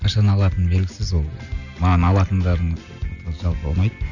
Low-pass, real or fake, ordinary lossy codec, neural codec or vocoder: 7.2 kHz; real; none; none